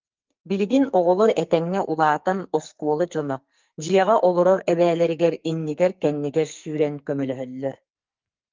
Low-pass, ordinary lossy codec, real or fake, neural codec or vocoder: 7.2 kHz; Opus, 24 kbps; fake; codec, 44.1 kHz, 2.6 kbps, SNAC